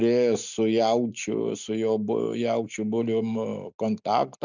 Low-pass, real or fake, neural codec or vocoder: 7.2 kHz; real; none